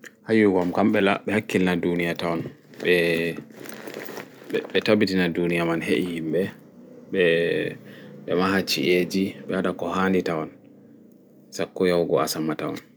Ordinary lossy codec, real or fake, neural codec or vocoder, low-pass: none; real; none; none